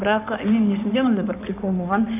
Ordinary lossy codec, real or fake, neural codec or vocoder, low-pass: AAC, 32 kbps; fake; codec, 16 kHz in and 24 kHz out, 2.2 kbps, FireRedTTS-2 codec; 3.6 kHz